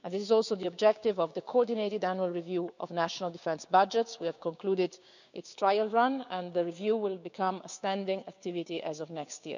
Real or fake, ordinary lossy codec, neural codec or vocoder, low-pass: fake; none; codec, 16 kHz, 6 kbps, DAC; 7.2 kHz